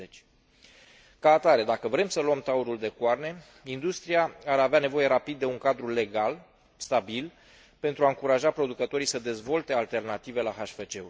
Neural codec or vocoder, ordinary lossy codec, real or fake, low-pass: none; none; real; none